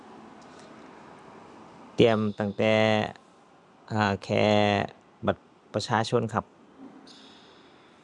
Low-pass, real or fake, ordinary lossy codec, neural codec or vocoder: 10.8 kHz; real; none; none